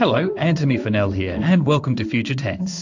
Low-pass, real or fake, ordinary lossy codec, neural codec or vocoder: 7.2 kHz; fake; AAC, 48 kbps; codec, 16 kHz in and 24 kHz out, 1 kbps, XY-Tokenizer